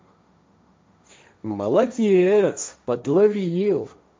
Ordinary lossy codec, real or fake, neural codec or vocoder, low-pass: none; fake; codec, 16 kHz, 1.1 kbps, Voila-Tokenizer; none